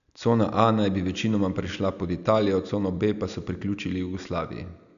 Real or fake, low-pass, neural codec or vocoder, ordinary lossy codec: real; 7.2 kHz; none; none